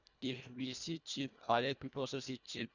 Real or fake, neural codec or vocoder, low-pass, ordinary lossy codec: fake; codec, 24 kHz, 1.5 kbps, HILCodec; 7.2 kHz; none